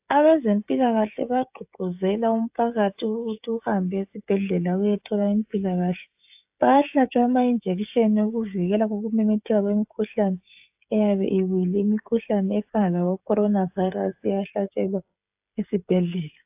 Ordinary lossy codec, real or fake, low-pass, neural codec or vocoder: AAC, 32 kbps; fake; 3.6 kHz; codec, 16 kHz, 16 kbps, FreqCodec, smaller model